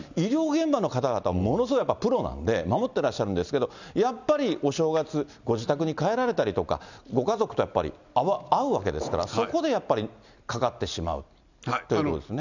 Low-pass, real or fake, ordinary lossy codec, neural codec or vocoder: 7.2 kHz; real; none; none